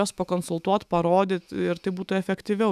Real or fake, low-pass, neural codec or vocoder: fake; 14.4 kHz; autoencoder, 48 kHz, 128 numbers a frame, DAC-VAE, trained on Japanese speech